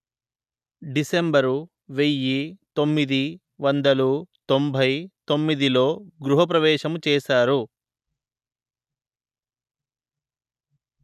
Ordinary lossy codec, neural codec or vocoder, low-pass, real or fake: none; none; 14.4 kHz; real